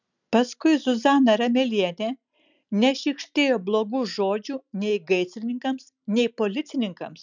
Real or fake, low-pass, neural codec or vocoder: real; 7.2 kHz; none